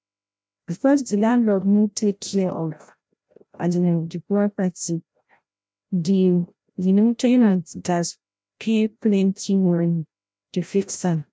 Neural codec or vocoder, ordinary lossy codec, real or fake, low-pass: codec, 16 kHz, 0.5 kbps, FreqCodec, larger model; none; fake; none